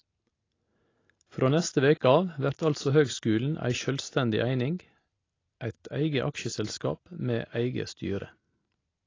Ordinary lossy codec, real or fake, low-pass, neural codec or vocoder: AAC, 32 kbps; real; 7.2 kHz; none